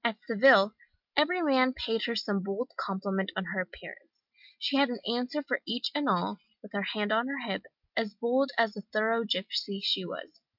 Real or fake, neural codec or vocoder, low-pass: real; none; 5.4 kHz